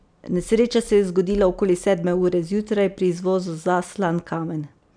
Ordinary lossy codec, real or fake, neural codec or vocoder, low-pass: none; real; none; 9.9 kHz